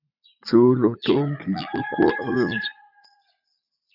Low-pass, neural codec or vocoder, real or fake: 5.4 kHz; vocoder, 44.1 kHz, 80 mel bands, Vocos; fake